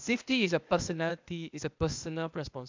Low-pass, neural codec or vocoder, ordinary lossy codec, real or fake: 7.2 kHz; codec, 16 kHz, 0.8 kbps, ZipCodec; MP3, 64 kbps; fake